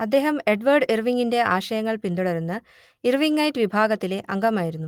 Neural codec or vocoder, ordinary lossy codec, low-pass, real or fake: none; Opus, 24 kbps; 19.8 kHz; real